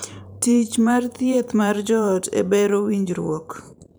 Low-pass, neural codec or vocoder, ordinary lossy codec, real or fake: none; vocoder, 44.1 kHz, 128 mel bands every 512 samples, BigVGAN v2; none; fake